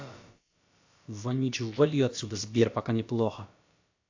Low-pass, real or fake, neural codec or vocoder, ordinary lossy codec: 7.2 kHz; fake; codec, 16 kHz, about 1 kbps, DyCAST, with the encoder's durations; AAC, 48 kbps